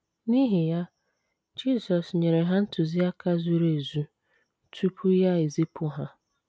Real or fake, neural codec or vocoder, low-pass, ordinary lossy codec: real; none; none; none